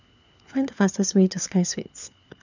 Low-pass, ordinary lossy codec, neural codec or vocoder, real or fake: 7.2 kHz; none; codec, 16 kHz, 4 kbps, FunCodec, trained on LibriTTS, 50 frames a second; fake